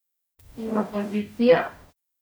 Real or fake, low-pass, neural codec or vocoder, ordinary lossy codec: fake; none; codec, 44.1 kHz, 0.9 kbps, DAC; none